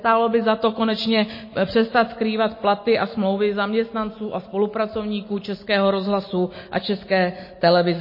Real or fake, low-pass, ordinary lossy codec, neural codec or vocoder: real; 5.4 kHz; MP3, 24 kbps; none